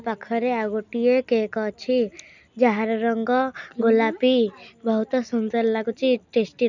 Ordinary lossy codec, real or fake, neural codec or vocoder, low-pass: none; real; none; 7.2 kHz